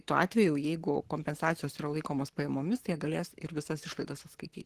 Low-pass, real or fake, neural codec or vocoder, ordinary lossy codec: 14.4 kHz; fake; codec, 44.1 kHz, 7.8 kbps, Pupu-Codec; Opus, 16 kbps